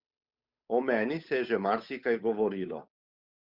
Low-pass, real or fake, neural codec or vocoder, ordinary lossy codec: 5.4 kHz; fake; codec, 16 kHz, 8 kbps, FunCodec, trained on Chinese and English, 25 frames a second; Opus, 64 kbps